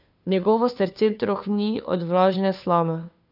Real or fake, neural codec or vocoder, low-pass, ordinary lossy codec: fake; codec, 16 kHz, 2 kbps, FunCodec, trained on Chinese and English, 25 frames a second; 5.4 kHz; none